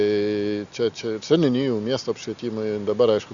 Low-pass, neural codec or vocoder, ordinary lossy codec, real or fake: 7.2 kHz; none; Opus, 64 kbps; real